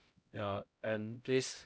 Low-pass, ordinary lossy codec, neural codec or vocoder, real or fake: none; none; codec, 16 kHz, 0.5 kbps, X-Codec, HuBERT features, trained on LibriSpeech; fake